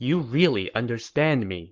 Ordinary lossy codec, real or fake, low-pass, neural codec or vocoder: Opus, 16 kbps; fake; 7.2 kHz; codec, 44.1 kHz, 7.8 kbps, Pupu-Codec